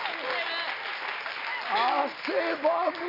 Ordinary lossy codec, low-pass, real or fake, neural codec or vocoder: none; 5.4 kHz; real; none